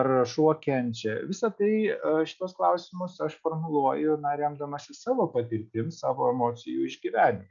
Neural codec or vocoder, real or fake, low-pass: none; real; 7.2 kHz